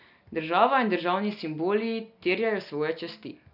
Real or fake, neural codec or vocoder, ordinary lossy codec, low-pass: real; none; none; 5.4 kHz